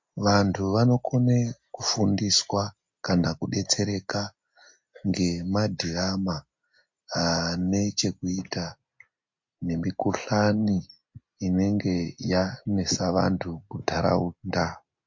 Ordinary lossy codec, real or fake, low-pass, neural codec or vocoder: MP3, 48 kbps; real; 7.2 kHz; none